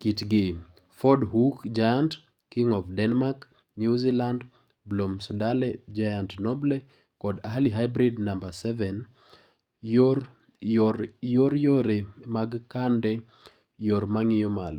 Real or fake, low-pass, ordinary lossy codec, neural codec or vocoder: fake; 19.8 kHz; Opus, 64 kbps; codec, 44.1 kHz, 7.8 kbps, DAC